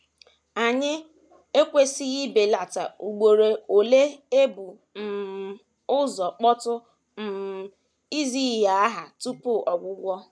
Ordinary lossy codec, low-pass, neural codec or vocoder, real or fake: none; none; none; real